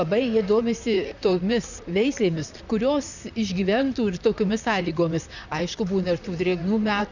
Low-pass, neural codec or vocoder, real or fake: 7.2 kHz; vocoder, 44.1 kHz, 128 mel bands, Pupu-Vocoder; fake